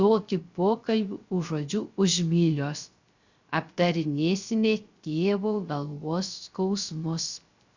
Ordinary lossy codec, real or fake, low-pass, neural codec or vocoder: Opus, 64 kbps; fake; 7.2 kHz; codec, 16 kHz, 0.3 kbps, FocalCodec